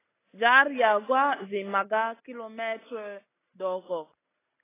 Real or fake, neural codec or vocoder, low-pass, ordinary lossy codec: real; none; 3.6 kHz; AAC, 16 kbps